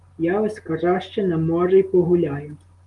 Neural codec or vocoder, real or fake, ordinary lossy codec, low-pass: none; real; Opus, 24 kbps; 10.8 kHz